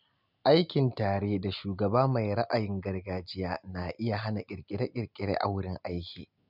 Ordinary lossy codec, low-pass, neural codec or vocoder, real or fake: none; 5.4 kHz; none; real